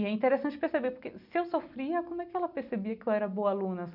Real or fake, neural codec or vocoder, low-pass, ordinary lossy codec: real; none; 5.4 kHz; none